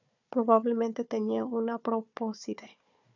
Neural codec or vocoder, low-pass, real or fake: codec, 16 kHz, 4 kbps, FunCodec, trained on Chinese and English, 50 frames a second; 7.2 kHz; fake